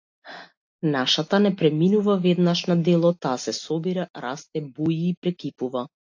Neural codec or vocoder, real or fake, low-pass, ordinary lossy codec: none; real; 7.2 kHz; AAC, 48 kbps